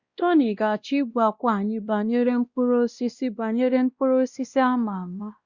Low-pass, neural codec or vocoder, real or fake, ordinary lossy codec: 7.2 kHz; codec, 16 kHz, 1 kbps, X-Codec, WavLM features, trained on Multilingual LibriSpeech; fake; Opus, 64 kbps